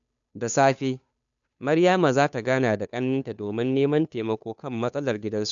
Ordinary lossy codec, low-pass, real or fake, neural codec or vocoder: none; 7.2 kHz; fake; codec, 16 kHz, 2 kbps, FunCodec, trained on Chinese and English, 25 frames a second